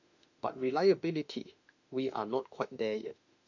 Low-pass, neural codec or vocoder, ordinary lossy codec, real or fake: 7.2 kHz; autoencoder, 48 kHz, 32 numbers a frame, DAC-VAE, trained on Japanese speech; none; fake